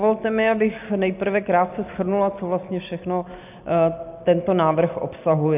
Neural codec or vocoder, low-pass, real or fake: none; 3.6 kHz; real